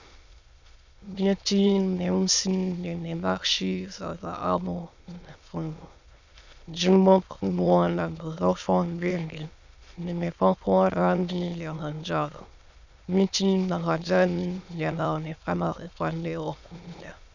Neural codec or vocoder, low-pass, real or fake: autoencoder, 22.05 kHz, a latent of 192 numbers a frame, VITS, trained on many speakers; 7.2 kHz; fake